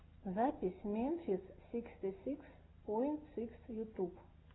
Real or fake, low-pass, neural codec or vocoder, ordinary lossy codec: real; 7.2 kHz; none; AAC, 16 kbps